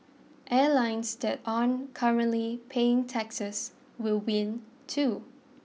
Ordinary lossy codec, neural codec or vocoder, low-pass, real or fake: none; none; none; real